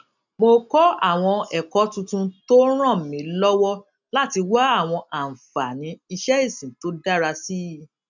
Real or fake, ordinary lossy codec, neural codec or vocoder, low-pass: real; none; none; 7.2 kHz